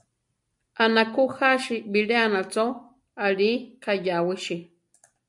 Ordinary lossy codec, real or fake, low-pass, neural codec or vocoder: MP3, 96 kbps; real; 10.8 kHz; none